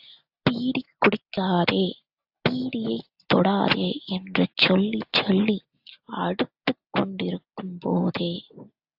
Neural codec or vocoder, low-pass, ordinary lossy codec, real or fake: none; 5.4 kHz; AAC, 48 kbps; real